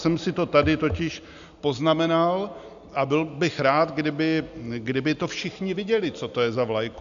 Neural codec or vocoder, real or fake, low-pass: none; real; 7.2 kHz